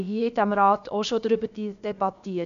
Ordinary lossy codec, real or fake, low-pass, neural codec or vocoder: none; fake; 7.2 kHz; codec, 16 kHz, about 1 kbps, DyCAST, with the encoder's durations